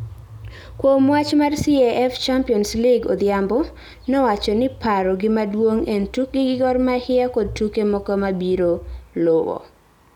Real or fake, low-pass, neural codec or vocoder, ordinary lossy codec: real; 19.8 kHz; none; none